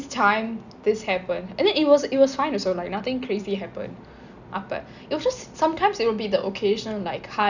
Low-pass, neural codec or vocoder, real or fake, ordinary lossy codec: 7.2 kHz; none; real; none